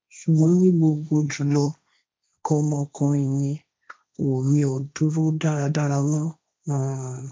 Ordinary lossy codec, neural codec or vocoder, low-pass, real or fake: none; codec, 16 kHz, 1.1 kbps, Voila-Tokenizer; none; fake